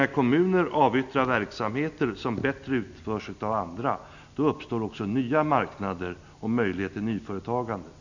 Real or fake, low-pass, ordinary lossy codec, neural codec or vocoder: real; 7.2 kHz; none; none